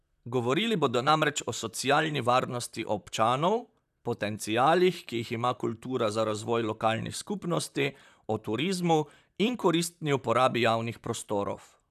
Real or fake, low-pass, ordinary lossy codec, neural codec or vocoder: fake; 14.4 kHz; none; vocoder, 44.1 kHz, 128 mel bands, Pupu-Vocoder